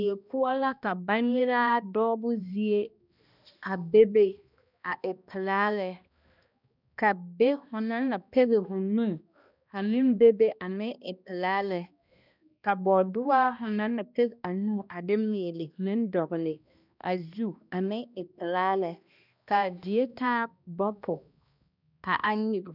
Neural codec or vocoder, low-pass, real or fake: codec, 16 kHz, 1 kbps, X-Codec, HuBERT features, trained on balanced general audio; 5.4 kHz; fake